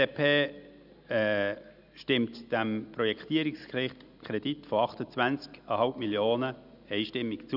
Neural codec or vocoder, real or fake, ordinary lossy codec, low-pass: none; real; none; 5.4 kHz